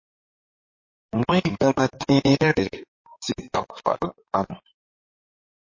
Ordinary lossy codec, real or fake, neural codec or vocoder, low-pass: MP3, 32 kbps; fake; codec, 16 kHz in and 24 kHz out, 2.2 kbps, FireRedTTS-2 codec; 7.2 kHz